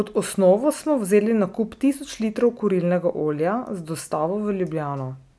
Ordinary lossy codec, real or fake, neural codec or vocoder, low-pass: none; real; none; none